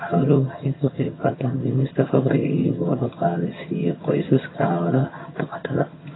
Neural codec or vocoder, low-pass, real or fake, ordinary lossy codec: vocoder, 22.05 kHz, 80 mel bands, HiFi-GAN; 7.2 kHz; fake; AAC, 16 kbps